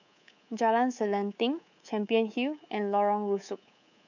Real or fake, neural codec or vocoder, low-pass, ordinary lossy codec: fake; codec, 24 kHz, 3.1 kbps, DualCodec; 7.2 kHz; none